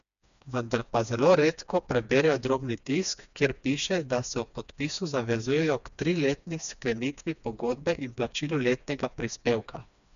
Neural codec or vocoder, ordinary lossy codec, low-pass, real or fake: codec, 16 kHz, 2 kbps, FreqCodec, smaller model; none; 7.2 kHz; fake